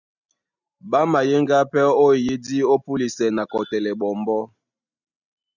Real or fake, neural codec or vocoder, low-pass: real; none; 7.2 kHz